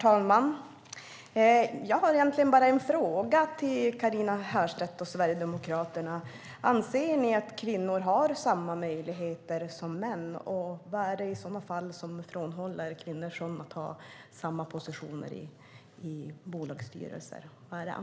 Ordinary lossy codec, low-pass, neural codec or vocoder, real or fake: none; none; none; real